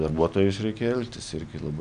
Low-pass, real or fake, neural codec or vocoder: 9.9 kHz; real; none